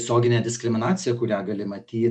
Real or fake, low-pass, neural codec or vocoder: real; 10.8 kHz; none